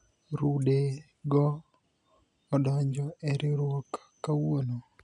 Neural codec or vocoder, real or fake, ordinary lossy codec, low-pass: none; real; none; 10.8 kHz